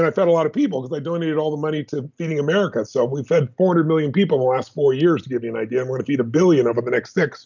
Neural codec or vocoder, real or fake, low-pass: none; real; 7.2 kHz